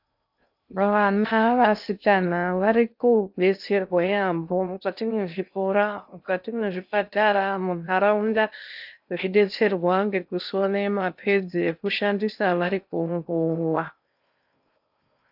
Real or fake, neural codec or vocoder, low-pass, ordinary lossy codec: fake; codec, 16 kHz in and 24 kHz out, 0.6 kbps, FocalCodec, streaming, 2048 codes; 5.4 kHz; AAC, 48 kbps